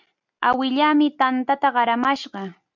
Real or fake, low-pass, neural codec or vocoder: real; 7.2 kHz; none